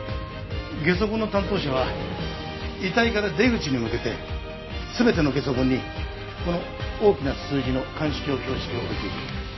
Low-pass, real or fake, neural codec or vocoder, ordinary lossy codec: 7.2 kHz; real; none; MP3, 24 kbps